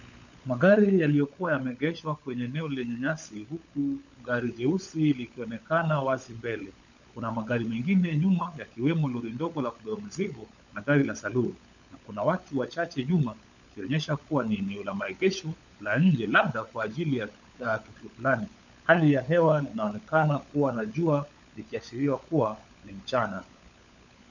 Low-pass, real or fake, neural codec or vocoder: 7.2 kHz; fake; codec, 16 kHz, 16 kbps, FunCodec, trained on LibriTTS, 50 frames a second